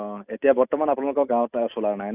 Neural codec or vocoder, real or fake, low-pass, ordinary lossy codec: none; real; 3.6 kHz; none